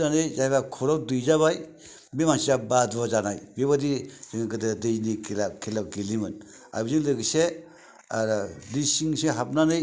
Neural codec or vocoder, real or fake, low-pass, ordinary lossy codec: none; real; none; none